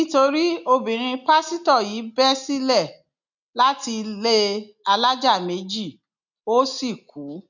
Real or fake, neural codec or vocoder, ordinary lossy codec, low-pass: real; none; none; 7.2 kHz